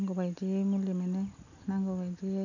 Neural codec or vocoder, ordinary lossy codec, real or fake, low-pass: none; none; real; 7.2 kHz